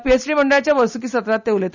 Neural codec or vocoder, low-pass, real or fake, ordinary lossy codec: none; 7.2 kHz; real; none